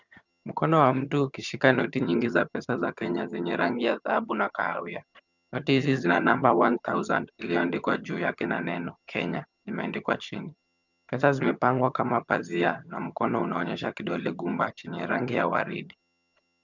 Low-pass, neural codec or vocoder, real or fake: 7.2 kHz; vocoder, 22.05 kHz, 80 mel bands, HiFi-GAN; fake